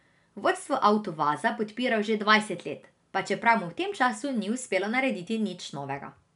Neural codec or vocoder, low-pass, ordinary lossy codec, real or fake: none; 10.8 kHz; none; real